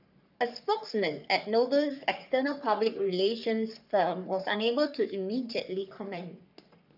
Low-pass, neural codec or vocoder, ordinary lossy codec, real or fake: 5.4 kHz; codec, 44.1 kHz, 3.4 kbps, Pupu-Codec; none; fake